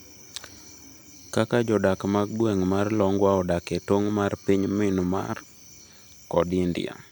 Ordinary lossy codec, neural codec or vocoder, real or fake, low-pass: none; vocoder, 44.1 kHz, 128 mel bands every 256 samples, BigVGAN v2; fake; none